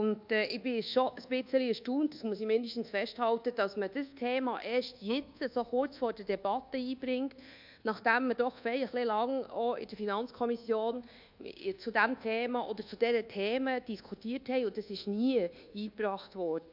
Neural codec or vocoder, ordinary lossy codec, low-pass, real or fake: codec, 24 kHz, 1.2 kbps, DualCodec; MP3, 48 kbps; 5.4 kHz; fake